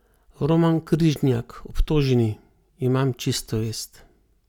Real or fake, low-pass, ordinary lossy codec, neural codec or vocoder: real; 19.8 kHz; none; none